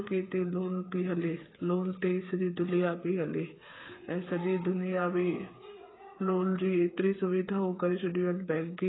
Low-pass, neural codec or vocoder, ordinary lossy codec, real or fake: 7.2 kHz; codec, 16 kHz, 8 kbps, FreqCodec, smaller model; AAC, 16 kbps; fake